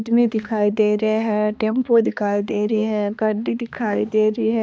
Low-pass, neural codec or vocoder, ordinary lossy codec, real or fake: none; codec, 16 kHz, 2 kbps, X-Codec, HuBERT features, trained on balanced general audio; none; fake